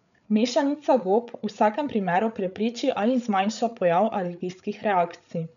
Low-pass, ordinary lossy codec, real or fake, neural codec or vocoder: 7.2 kHz; none; fake; codec, 16 kHz, 8 kbps, FreqCodec, larger model